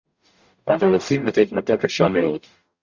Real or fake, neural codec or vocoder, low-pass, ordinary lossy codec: fake; codec, 44.1 kHz, 0.9 kbps, DAC; 7.2 kHz; Opus, 64 kbps